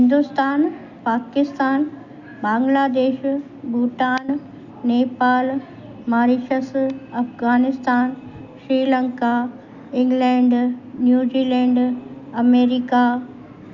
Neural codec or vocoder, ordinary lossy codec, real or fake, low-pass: none; none; real; 7.2 kHz